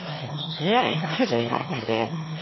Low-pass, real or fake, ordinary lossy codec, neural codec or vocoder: 7.2 kHz; fake; MP3, 24 kbps; autoencoder, 22.05 kHz, a latent of 192 numbers a frame, VITS, trained on one speaker